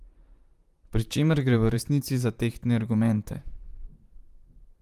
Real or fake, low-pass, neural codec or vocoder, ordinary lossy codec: fake; 14.4 kHz; vocoder, 44.1 kHz, 128 mel bands, Pupu-Vocoder; Opus, 32 kbps